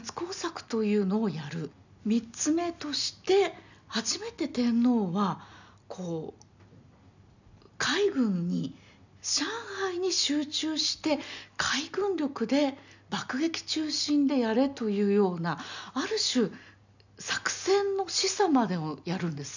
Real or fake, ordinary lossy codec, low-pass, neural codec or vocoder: real; none; 7.2 kHz; none